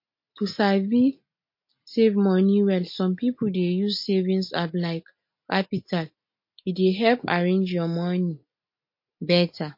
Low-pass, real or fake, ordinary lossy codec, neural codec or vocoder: 5.4 kHz; real; MP3, 32 kbps; none